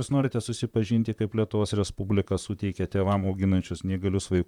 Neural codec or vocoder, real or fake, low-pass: vocoder, 44.1 kHz, 128 mel bands, Pupu-Vocoder; fake; 19.8 kHz